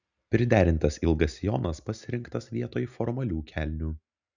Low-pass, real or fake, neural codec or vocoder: 7.2 kHz; real; none